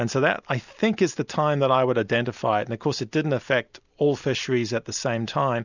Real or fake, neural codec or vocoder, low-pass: real; none; 7.2 kHz